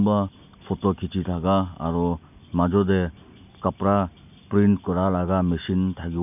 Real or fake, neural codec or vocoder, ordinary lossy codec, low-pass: real; none; none; 3.6 kHz